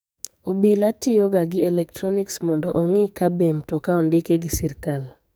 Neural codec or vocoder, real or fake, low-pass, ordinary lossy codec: codec, 44.1 kHz, 2.6 kbps, SNAC; fake; none; none